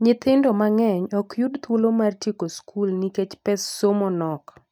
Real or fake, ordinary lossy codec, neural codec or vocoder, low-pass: real; none; none; 19.8 kHz